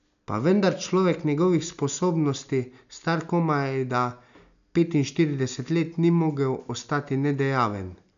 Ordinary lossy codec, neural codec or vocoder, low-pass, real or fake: none; none; 7.2 kHz; real